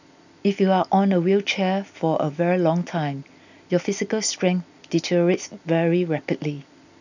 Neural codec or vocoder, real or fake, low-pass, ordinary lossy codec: none; real; 7.2 kHz; none